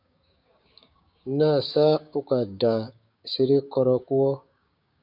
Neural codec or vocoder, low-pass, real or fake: codec, 16 kHz, 6 kbps, DAC; 5.4 kHz; fake